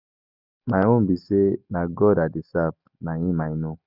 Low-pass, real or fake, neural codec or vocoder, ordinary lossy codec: 5.4 kHz; real; none; none